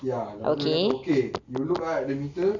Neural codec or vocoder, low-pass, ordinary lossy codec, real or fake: none; 7.2 kHz; none; real